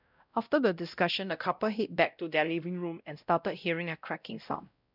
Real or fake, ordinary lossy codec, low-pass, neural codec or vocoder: fake; none; 5.4 kHz; codec, 16 kHz, 0.5 kbps, X-Codec, WavLM features, trained on Multilingual LibriSpeech